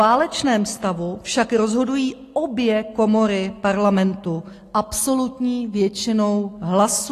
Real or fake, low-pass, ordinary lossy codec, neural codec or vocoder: real; 14.4 kHz; AAC, 48 kbps; none